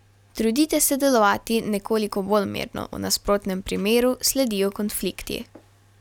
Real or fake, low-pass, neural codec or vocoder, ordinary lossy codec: real; 19.8 kHz; none; none